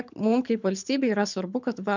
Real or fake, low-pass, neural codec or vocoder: fake; 7.2 kHz; codec, 24 kHz, 6 kbps, HILCodec